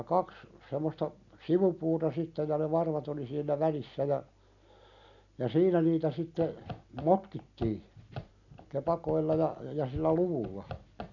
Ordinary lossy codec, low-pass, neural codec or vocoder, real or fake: none; 7.2 kHz; none; real